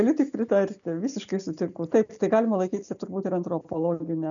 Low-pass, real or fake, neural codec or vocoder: 7.2 kHz; real; none